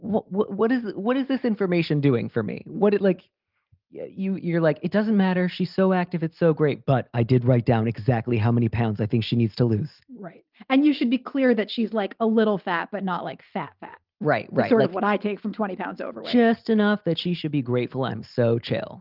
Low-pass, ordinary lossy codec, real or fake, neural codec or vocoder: 5.4 kHz; Opus, 32 kbps; real; none